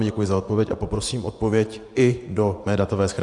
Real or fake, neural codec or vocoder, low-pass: fake; vocoder, 24 kHz, 100 mel bands, Vocos; 10.8 kHz